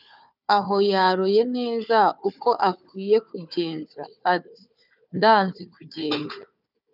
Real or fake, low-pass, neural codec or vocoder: fake; 5.4 kHz; codec, 16 kHz, 4 kbps, FunCodec, trained on Chinese and English, 50 frames a second